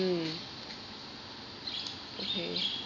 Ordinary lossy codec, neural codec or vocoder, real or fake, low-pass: none; none; real; 7.2 kHz